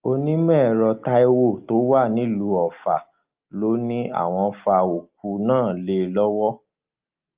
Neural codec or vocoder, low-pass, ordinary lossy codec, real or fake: none; 3.6 kHz; Opus, 32 kbps; real